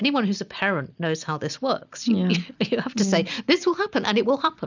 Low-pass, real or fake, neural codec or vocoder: 7.2 kHz; real; none